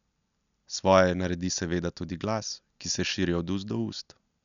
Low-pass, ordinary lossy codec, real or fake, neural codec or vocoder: 7.2 kHz; none; real; none